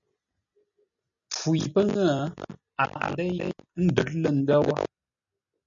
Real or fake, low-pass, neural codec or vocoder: real; 7.2 kHz; none